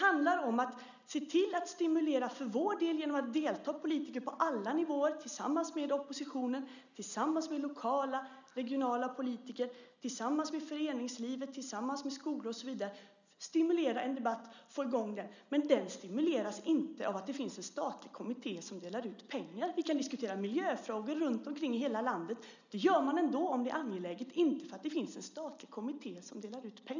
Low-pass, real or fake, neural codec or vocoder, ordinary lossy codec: 7.2 kHz; real; none; none